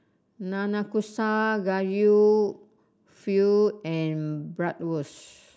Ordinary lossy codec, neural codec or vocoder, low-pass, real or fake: none; none; none; real